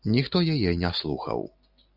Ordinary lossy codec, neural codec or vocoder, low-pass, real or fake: Opus, 64 kbps; none; 5.4 kHz; real